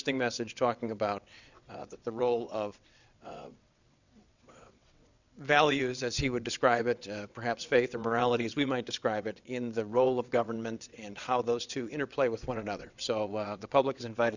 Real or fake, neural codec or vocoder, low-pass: fake; vocoder, 22.05 kHz, 80 mel bands, WaveNeXt; 7.2 kHz